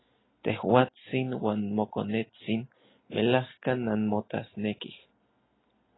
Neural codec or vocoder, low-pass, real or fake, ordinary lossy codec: none; 7.2 kHz; real; AAC, 16 kbps